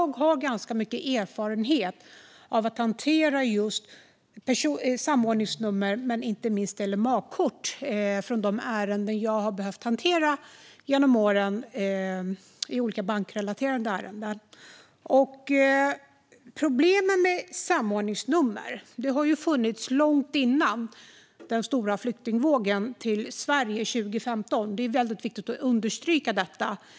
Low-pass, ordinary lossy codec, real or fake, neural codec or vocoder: none; none; real; none